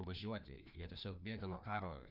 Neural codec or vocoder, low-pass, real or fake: codec, 16 kHz, 2 kbps, FreqCodec, larger model; 5.4 kHz; fake